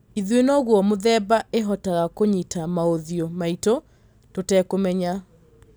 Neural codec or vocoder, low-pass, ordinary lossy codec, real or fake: none; none; none; real